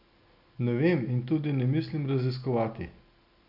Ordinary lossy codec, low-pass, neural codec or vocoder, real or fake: none; 5.4 kHz; none; real